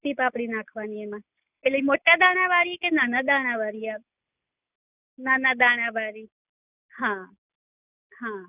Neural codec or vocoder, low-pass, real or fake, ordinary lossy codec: none; 3.6 kHz; real; none